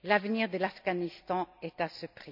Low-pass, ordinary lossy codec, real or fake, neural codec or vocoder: 5.4 kHz; none; real; none